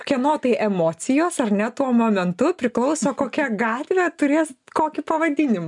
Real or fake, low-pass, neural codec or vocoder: real; 10.8 kHz; none